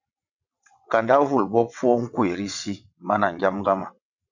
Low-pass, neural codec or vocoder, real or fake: 7.2 kHz; vocoder, 22.05 kHz, 80 mel bands, WaveNeXt; fake